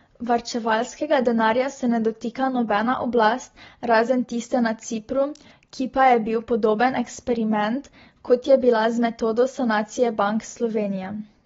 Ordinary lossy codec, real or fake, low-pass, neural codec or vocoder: AAC, 24 kbps; real; 7.2 kHz; none